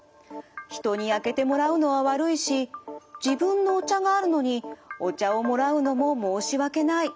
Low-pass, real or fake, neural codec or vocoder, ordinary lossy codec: none; real; none; none